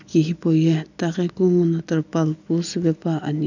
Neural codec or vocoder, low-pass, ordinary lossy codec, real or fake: none; 7.2 kHz; none; real